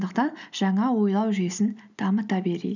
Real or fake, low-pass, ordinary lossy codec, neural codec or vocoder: fake; 7.2 kHz; none; vocoder, 44.1 kHz, 128 mel bands every 256 samples, BigVGAN v2